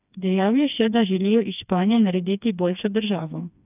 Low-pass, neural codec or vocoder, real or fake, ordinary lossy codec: 3.6 kHz; codec, 16 kHz, 2 kbps, FreqCodec, smaller model; fake; none